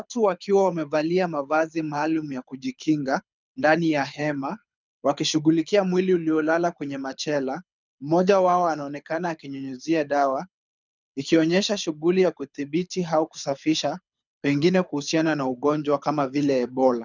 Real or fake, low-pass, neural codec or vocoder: fake; 7.2 kHz; codec, 24 kHz, 6 kbps, HILCodec